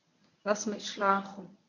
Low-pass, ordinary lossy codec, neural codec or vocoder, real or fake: 7.2 kHz; none; codec, 24 kHz, 0.9 kbps, WavTokenizer, medium speech release version 1; fake